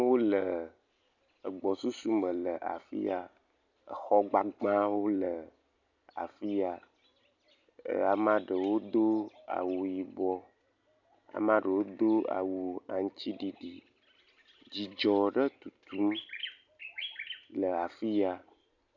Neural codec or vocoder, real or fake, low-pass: none; real; 7.2 kHz